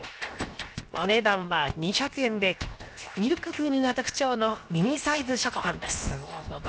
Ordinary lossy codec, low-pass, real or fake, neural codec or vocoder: none; none; fake; codec, 16 kHz, 0.7 kbps, FocalCodec